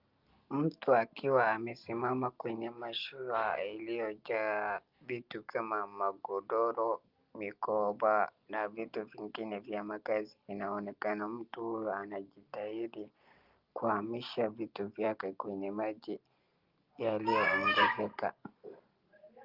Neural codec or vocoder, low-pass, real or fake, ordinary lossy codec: none; 5.4 kHz; real; Opus, 24 kbps